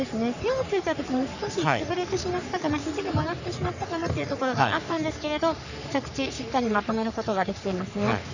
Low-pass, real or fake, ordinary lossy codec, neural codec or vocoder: 7.2 kHz; fake; none; codec, 44.1 kHz, 3.4 kbps, Pupu-Codec